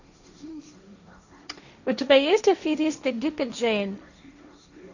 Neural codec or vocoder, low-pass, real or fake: codec, 16 kHz, 1.1 kbps, Voila-Tokenizer; 7.2 kHz; fake